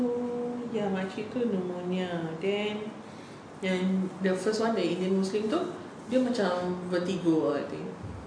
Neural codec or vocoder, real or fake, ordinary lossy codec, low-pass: none; real; MP3, 48 kbps; 9.9 kHz